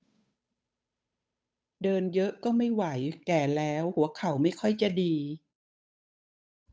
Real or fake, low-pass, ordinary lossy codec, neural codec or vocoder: fake; none; none; codec, 16 kHz, 8 kbps, FunCodec, trained on Chinese and English, 25 frames a second